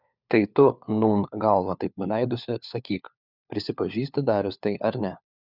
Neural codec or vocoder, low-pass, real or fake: codec, 16 kHz, 4 kbps, FunCodec, trained on LibriTTS, 50 frames a second; 5.4 kHz; fake